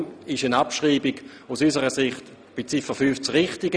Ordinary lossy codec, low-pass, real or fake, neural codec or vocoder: none; none; real; none